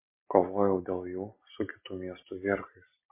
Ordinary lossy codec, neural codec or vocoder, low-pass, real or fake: MP3, 24 kbps; none; 3.6 kHz; real